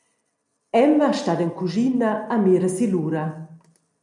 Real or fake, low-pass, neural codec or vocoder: real; 10.8 kHz; none